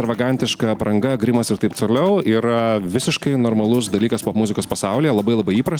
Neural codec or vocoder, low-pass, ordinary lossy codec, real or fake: none; 19.8 kHz; Opus, 24 kbps; real